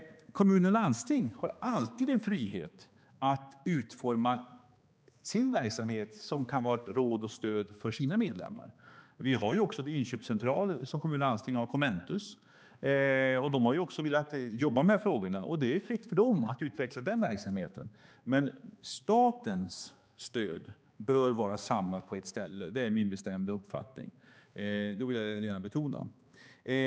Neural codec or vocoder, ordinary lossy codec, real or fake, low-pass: codec, 16 kHz, 2 kbps, X-Codec, HuBERT features, trained on balanced general audio; none; fake; none